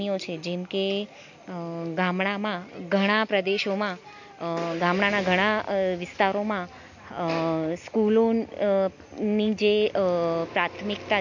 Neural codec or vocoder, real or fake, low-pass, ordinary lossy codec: none; real; 7.2 kHz; MP3, 48 kbps